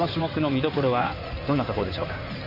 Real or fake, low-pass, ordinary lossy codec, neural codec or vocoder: fake; 5.4 kHz; none; codec, 16 kHz in and 24 kHz out, 2.2 kbps, FireRedTTS-2 codec